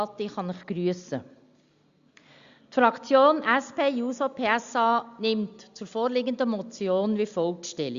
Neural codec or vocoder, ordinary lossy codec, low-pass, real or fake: none; Opus, 64 kbps; 7.2 kHz; real